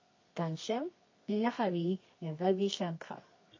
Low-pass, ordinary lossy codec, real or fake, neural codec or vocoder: 7.2 kHz; MP3, 32 kbps; fake; codec, 24 kHz, 0.9 kbps, WavTokenizer, medium music audio release